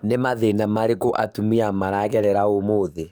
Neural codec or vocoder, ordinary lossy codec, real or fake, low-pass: codec, 44.1 kHz, 7.8 kbps, DAC; none; fake; none